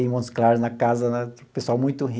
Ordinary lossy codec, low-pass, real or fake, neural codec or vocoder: none; none; real; none